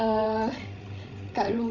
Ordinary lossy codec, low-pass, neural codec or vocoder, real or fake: none; 7.2 kHz; codec, 16 kHz, 16 kbps, FreqCodec, larger model; fake